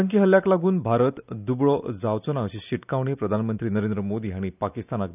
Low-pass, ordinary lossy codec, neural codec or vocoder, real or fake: 3.6 kHz; none; none; real